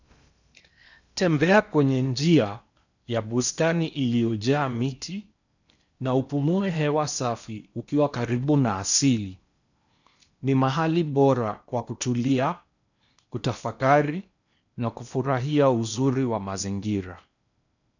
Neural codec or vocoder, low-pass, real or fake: codec, 16 kHz in and 24 kHz out, 0.8 kbps, FocalCodec, streaming, 65536 codes; 7.2 kHz; fake